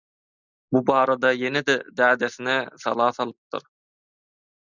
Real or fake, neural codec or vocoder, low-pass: real; none; 7.2 kHz